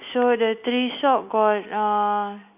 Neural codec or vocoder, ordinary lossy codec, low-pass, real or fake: none; none; 3.6 kHz; real